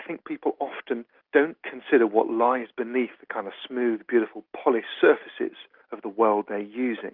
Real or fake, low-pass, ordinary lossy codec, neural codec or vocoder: real; 5.4 kHz; Opus, 24 kbps; none